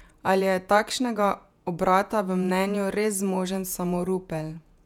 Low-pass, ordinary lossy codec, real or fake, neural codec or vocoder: 19.8 kHz; none; fake; vocoder, 48 kHz, 128 mel bands, Vocos